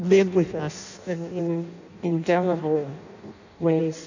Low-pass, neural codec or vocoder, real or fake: 7.2 kHz; codec, 16 kHz in and 24 kHz out, 0.6 kbps, FireRedTTS-2 codec; fake